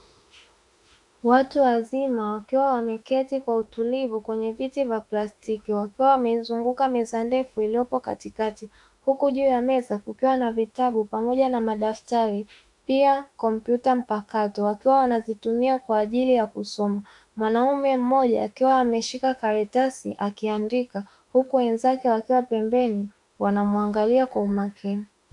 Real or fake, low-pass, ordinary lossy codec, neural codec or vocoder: fake; 10.8 kHz; AAC, 64 kbps; autoencoder, 48 kHz, 32 numbers a frame, DAC-VAE, trained on Japanese speech